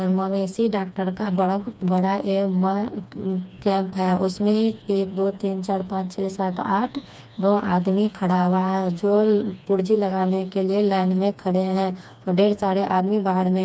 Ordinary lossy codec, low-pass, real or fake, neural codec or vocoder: none; none; fake; codec, 16 kHz, 2 kbps, FreqCodec, smaller model